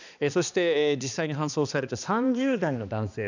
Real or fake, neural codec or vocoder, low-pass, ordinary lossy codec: fake; codec, 16 kHz, 2 kbps, X-Codec, HuBERT features, trained on balanced general audio; 7.2 kHz; none